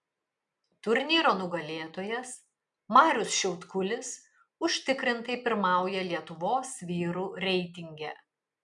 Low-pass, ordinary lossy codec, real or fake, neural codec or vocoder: 10.8 kHz; MP3, 96 kbps; real; none